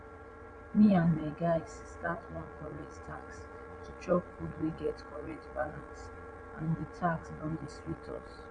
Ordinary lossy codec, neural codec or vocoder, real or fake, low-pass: none; vocoder, 22.05 kHz, 80 mel bands, Vocos; fake; 9.9 kHz